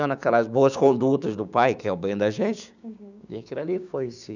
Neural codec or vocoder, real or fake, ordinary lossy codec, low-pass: codec, 16 kHz, 6 kbps, DAC; fake; none; 7.2 kHz